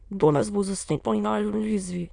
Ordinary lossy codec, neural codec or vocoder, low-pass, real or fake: none; autoencoder, 22.05 kHz, a latent of 192 numbers a frame, VITS, trained on many speakers; 9.9 kHz; fake